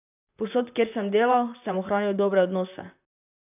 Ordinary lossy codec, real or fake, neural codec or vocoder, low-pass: none; fake; vocoder, 44.1 kHz, 128 mel bands, Pupu-Vocoder; 3.6 kHz